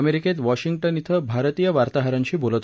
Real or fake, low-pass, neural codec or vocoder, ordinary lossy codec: real; none; none; none